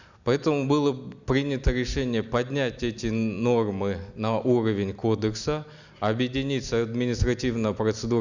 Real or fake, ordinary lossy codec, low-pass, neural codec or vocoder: real; none; 7.2 kHz; none